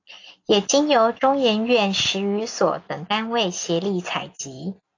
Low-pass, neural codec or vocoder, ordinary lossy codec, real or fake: 7.2 kHz; none; AAC, 48 kbps; real